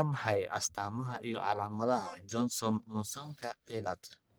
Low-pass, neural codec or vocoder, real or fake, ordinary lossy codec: none; codec, 44.1 kHz, 1.7 kbps, Pupu-Codec; fake; none